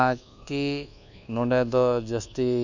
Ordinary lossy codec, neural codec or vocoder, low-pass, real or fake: none; codec, 24 kHz, 1.2 kbps, DualCodec; 7.2 kHz; fake